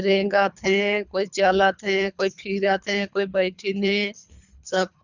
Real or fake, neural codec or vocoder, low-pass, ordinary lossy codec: fake; codec, 24 kHz, 3 kbps, HILCodec; 7.2 kHz; none